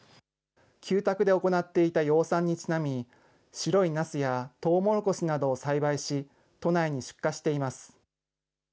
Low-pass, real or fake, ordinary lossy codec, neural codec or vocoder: none; real; none; none